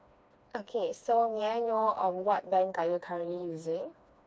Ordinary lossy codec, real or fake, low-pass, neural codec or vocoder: none; fake; none; codec, 16 kHz, 2 kbps, FreqCodec, smaller model